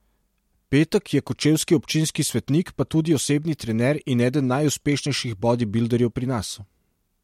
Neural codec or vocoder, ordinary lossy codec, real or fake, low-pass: none; MP3, 64 kbps; real; 19.8 kHz